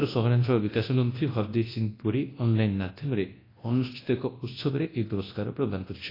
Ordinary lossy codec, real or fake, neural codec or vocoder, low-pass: AAC, 24 kbps; fake; codec, 24 kHz, 0.9 kbps, WavTokenizer, large speech release; 5.4 kHz